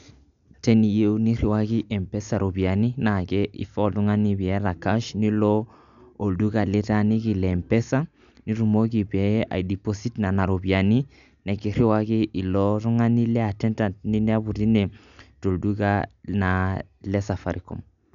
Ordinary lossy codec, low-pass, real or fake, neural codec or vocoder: none; 7.2 kHz; real; none